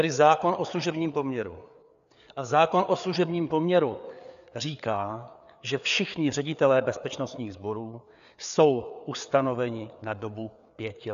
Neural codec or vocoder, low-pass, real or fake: codec, 16 kHz, 4 kbps, FreqCodec, larger model; 7.2 kHz; fake